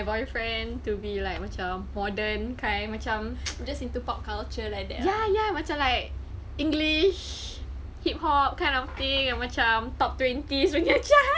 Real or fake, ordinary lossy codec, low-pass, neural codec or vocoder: real; none; none; none